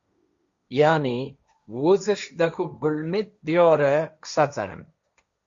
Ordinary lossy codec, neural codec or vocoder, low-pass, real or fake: Opus, 64 kbps; codec, 16 kHz, 1.1 kbps, Voila-Tokenizer; 7.2 kHz; fake